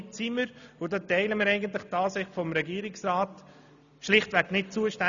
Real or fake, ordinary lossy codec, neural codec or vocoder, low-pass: real; none; none; 7.2 kHz